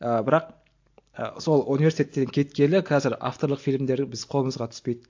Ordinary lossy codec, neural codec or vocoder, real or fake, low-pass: AAC, 48 kbps; none; real; 7.2 kHz